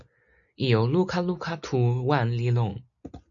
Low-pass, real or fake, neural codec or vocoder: 7.2 kHz; real; none